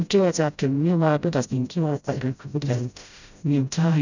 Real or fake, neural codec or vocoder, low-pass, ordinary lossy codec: fake; codec, 16 kHz, 0.5 kbps, FreqCodec, smaller model; 7.2 kHz; none